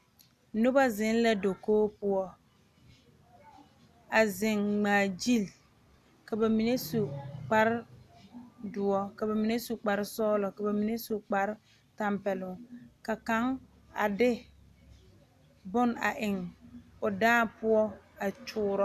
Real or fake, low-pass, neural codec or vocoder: real; 14.4 kHz; none